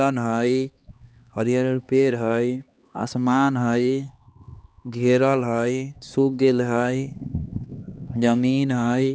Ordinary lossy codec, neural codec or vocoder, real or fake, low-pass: none; codec, 16 kHz, 2 kbps, X-Codec, HuBERT features, trained on LibriSpeech; fake; none